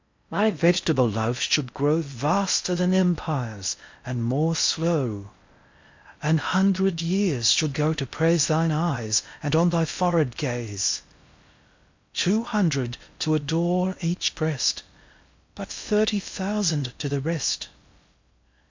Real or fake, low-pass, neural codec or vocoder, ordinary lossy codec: fake; 7.2 kHz; codec, 16 kHz in and 24 kHz out, 0.6 kbps, FocalCodec, streaming, 4096 codes; MP3, 64 kbps